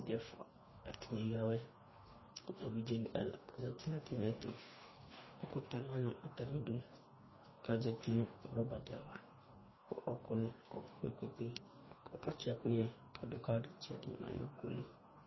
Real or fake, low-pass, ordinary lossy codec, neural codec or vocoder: fake; 7.2 kHz; MP3, 24 kbps; codec, 44.1 kHz, 2.6 kbps, DAC